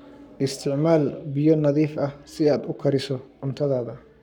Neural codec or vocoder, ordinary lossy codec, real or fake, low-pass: codec, 44.1 kHz, 7.8 kbps, Pupu-Codec; none; fake; 19.8 kHz